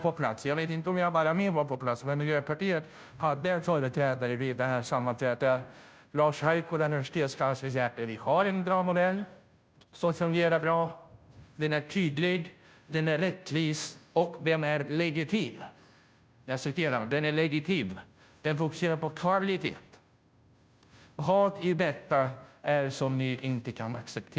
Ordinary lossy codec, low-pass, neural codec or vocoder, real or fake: none; none; codec, 16 kHz, 0.5 kbps, FunCodec, trained on Chinese and English, 25 frames a second; fake